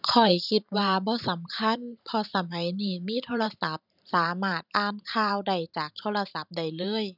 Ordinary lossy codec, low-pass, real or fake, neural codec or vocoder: none; 5.4 kHz; fake; vocoder, 44.1 kHz, 128 mel bands every 512 samples, BigVGAN v2